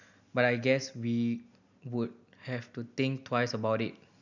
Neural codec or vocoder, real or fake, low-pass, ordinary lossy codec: none; real; 7.2 kHz; none